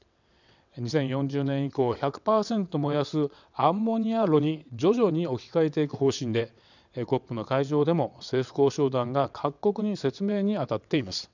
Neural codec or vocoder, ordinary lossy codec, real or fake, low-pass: vocoder, 22.05 kHz, 80 mel bands, WaveNeXt; none; fake; 7.2 kHz